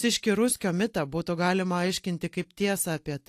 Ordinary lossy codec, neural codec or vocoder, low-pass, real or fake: AAC, 64 kbps; none; 14.4 kHz; real